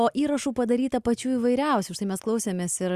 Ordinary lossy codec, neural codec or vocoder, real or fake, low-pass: Opus, 64 kbps; none; real; 14.4 kHz